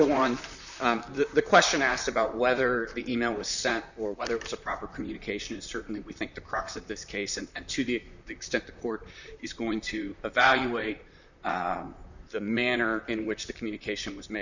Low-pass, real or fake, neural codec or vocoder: 7.2 kHz; fake; vocoder, 44.1 kHz, 128 mel bands, Pupu-Vocoder